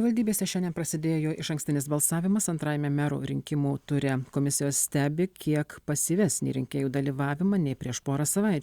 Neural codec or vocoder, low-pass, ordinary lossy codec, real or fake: none; 19.8 kHz; Opus, 64 kbps; real